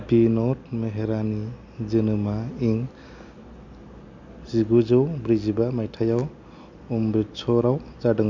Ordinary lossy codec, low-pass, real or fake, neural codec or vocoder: MP3, 64 kbps; 7.2 kHz; real; none